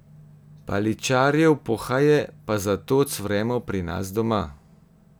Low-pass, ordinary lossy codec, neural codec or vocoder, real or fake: none; none; none; real